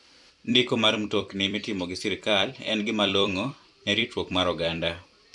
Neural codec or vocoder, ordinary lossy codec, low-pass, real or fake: vocoder, 44.1 kHz, 128 mel bands every 256 samples, BigVGAN v2; none; 10.8 kHz; fake